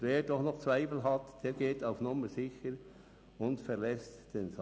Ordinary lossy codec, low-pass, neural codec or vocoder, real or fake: none; none; none; real